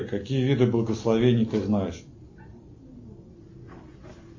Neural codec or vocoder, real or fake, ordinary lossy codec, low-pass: none; real; MP3, 32 kbps; 7.2 kHz